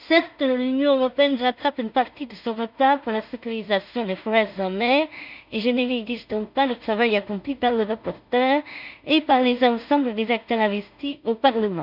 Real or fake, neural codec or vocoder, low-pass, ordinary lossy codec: fake; codec, 16 kHz in and 24 kHz out, 0.4 kbps, LongCat-Audio-Codec, two codebook decoder; 5.4 kHz; none